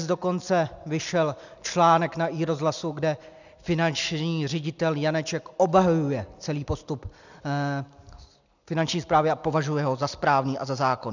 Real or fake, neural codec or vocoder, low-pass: real; none; 7.2 kHz